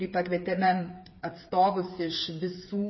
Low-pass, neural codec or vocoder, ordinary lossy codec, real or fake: 7.2 kHz; codec, 16 kHz, 8 kbps, FreqCodec, smaller model; MP3, 24 kbps; fake